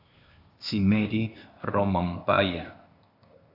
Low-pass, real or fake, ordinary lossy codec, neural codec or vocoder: 5.4 kHz; fake; AAC, 48 kbps; codec, 16 kHz, 0.8 kbps, ZipCodec